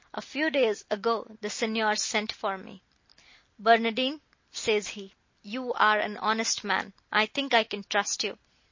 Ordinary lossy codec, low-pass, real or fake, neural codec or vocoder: MP3, 32 kbps; 7.2 kHz; real; none